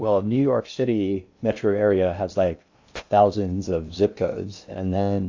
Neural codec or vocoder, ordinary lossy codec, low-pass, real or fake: codec, 16 kHz in and 24 kHz out, 0.6 kbps, FocalCodec, streaming, 2048 codes; AAC, 48 kbps; 7.2 kHz; fake